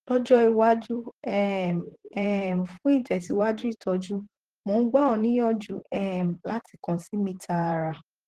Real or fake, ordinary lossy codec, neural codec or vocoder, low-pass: fake; Opus, 16 kbps; vocoder, 44.1 kHz, 128 mel bands, Pupu-Vocoder; 14.4 kHz